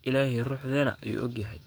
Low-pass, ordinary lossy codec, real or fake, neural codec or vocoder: none; none; fake; codec, 44.1 kHz, 7.8 kbps, DAC